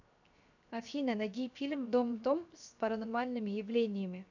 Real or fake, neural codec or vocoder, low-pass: fake; codec, 16 kHz, 0.7 kbps, FocalCodec; 7.2 kHz